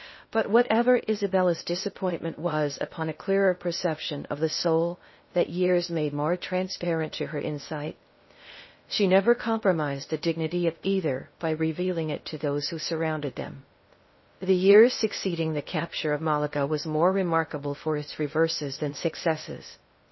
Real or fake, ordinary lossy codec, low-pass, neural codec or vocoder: fake; MP3, 24 kbps; 7.2 kHz; codec, 16 kHz in and 24 kHz out, 0.6 kbps, FocalCodec, streaming, 2048 codes